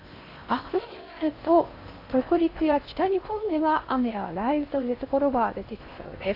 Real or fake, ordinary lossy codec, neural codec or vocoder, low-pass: fake; AAC, 32 kbps; codec, 16 kHz in and 24 kHz out, 0.6 kbps, FocalCodec, streaming, 4096 codes; 5.4 kHz